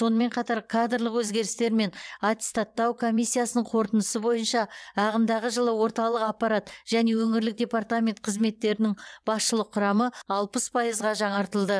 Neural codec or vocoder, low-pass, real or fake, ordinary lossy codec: vocoder, 22.05 kHz, 80 mel bands, WaveNeXt; none; fake; none